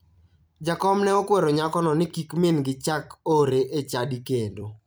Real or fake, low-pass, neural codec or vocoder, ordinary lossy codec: fake; none; vocoder, 44.1 kHz, 128 mel bands every 256 samples, BigVGAN v2; none